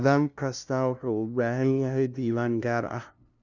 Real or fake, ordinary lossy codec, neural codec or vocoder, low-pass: fake; none; codec, 16 kHz, 0.5 kbps, FunCodec, trained on LibriTTS, 25 frames a second; 7.2 kHz